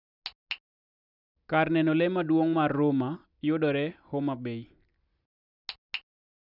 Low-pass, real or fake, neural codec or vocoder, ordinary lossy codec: 5.4 kHz; real; none; none